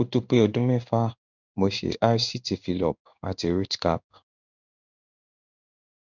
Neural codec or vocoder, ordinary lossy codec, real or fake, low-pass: codec, 16 kHz in and 24 kHz out, 1 kbps, XY-Tokenizer; none; fake; 7.2 kHz